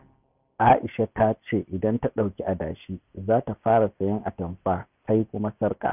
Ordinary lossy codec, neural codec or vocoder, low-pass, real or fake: none; none; 3.6 kHz; real